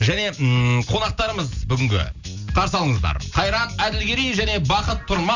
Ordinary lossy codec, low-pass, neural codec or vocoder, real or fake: none; 7.2 kHz; none; real